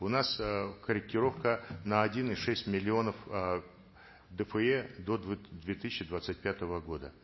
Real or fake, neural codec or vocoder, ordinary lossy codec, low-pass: real; none; MP3, 24 kbps; 7.2 kHz